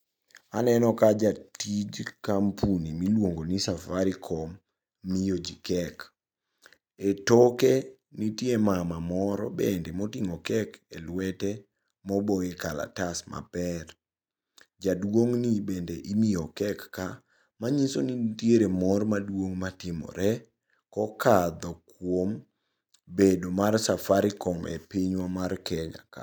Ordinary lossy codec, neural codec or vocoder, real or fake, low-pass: none; none; real; none